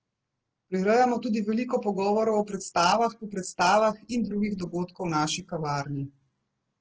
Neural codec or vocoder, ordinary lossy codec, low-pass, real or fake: none; Opus, 16 kbps; 7.2 kHz; real